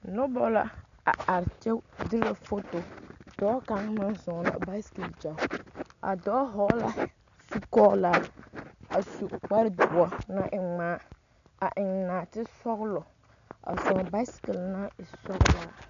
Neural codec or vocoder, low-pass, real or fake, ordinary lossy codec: none; 7.2 kHz; real; MP3, 96 kbps